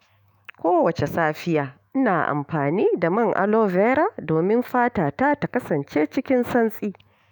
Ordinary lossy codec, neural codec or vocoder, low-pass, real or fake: none; autoencoder, 48 kHz, 128 numbers a frame, DAC-VAE, trained on Japanese speech; none; fake